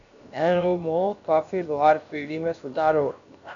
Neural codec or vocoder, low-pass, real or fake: codec, 16 kHz, 0.7 kbps, FocalCodec; 7.2 kHz; fake